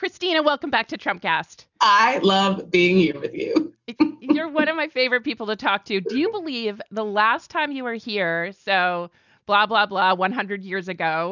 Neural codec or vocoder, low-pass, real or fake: none; 7.2 kHz; real